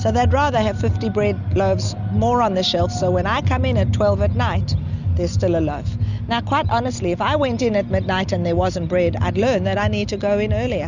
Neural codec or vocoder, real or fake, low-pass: none; real; 7.2 kHz